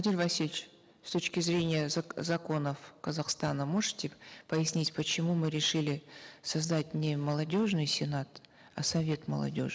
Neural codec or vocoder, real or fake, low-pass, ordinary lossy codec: none; real; none; none